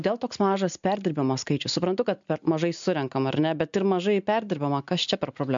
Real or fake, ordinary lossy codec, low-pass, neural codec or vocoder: real; MP3, 64 kbps; 7.2 kHz; none